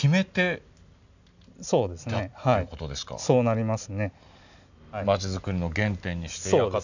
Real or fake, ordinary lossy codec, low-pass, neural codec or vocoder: real; none; 7.2 kHz; none